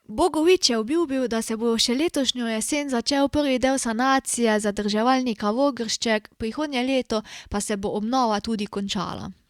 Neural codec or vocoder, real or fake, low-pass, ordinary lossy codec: none; real; 19.8 kHz; Opus, 64 kbps